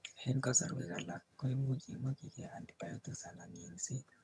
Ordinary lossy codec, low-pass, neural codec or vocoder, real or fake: none; none; vocoder, 22.05 kHz, 80 mel bands, HiFi-GAN; fake